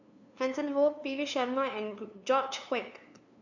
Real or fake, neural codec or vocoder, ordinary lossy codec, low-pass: fake; codec, 16 kHz, 2 kbps, FunCodec, trained on LibriTTS, 25 frames a second; none; 7.2 kHz